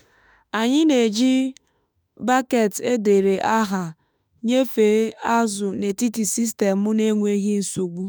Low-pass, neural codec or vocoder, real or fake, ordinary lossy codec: none; autoencoder, 48 kHz, 32 numbers a frame, DAC-VAE, trained on Japanese speech; fake; none